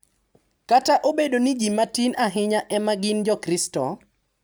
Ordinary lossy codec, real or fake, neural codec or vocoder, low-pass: none; real; none; none